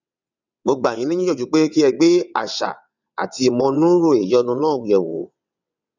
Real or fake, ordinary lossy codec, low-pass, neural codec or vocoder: fake; none; 7.2 kHz; vocoder, 22.05 kHz, 80 mel bands, WaveNeXt